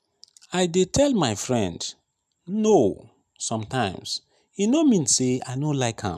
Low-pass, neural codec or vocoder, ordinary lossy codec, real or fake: 14.4 kHz; none; none; real